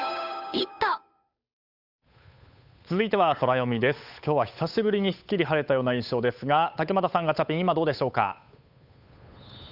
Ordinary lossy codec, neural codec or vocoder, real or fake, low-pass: none; codec, 16 kHz, 8 kbps, FunCodec, trained on Chinese and English, 25 frames a second; fake; 5.4 kHz